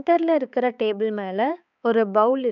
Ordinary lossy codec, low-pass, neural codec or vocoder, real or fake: none; 7.2 kHz; codec, 16 kHz, 4 kbps, X-Codec, HuBERT features, trained on balanced general audio; fake